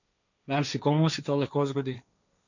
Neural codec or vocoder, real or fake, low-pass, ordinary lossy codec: codec, 16 kHz, 1.1 kbps, Voila-Tokenizer; fake; 7.2 kHz; none